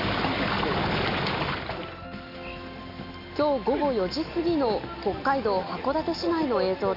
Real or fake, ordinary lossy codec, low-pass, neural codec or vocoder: real; none; 5.4 kHz; none